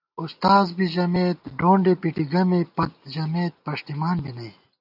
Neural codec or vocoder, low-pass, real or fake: none; 5.4 kHz; real